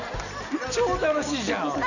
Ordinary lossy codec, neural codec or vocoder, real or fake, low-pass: none; none; real; 7.2 kHz